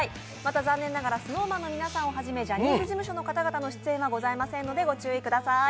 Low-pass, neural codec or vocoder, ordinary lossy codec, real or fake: none; none; none; real